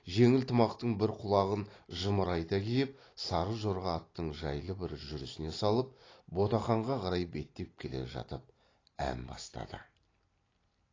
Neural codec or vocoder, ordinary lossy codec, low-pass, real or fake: none; AAC, 32 kbps; 7.2 kHz; real